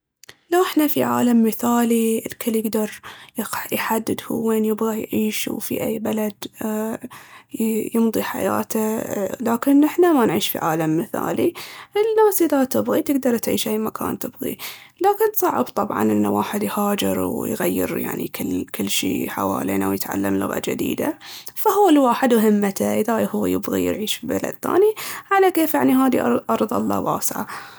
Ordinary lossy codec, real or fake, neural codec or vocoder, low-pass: none; real; none; none